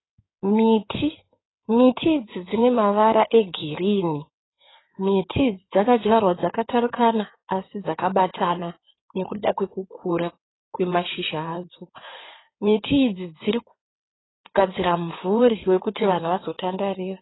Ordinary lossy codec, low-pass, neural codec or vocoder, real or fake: AAC, 16 kbps; 7.2 kHz; codec, 16 kHz in and 24 kHz out, 2.2 kbps, FireRedTTS-2 codec; fake